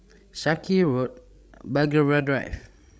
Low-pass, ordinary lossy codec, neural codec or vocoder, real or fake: none; none; codec, 16 kHz, 16 kbps, FreqCodec, larger model; fake